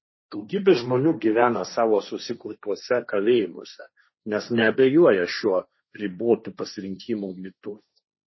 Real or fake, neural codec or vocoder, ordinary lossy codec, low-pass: fake; codec, 16 kHz, 1.1 kbps, Voila-Tokenizer; MP3, 24 kbps; 7.2 kHz